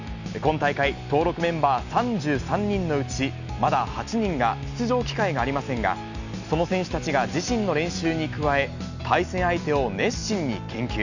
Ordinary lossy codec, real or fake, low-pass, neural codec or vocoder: none; real; 7.2 kHz; none